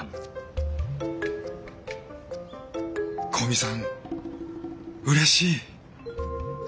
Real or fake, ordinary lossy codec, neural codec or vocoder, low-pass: real; none; none; none